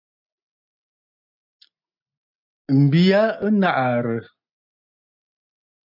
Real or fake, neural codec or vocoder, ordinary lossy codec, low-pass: real; none; MP3, 48 kbps; 5.4 kHz